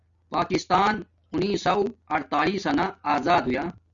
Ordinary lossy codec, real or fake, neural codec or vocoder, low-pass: Opus, 64 kbps; real; none; 7.2 kHz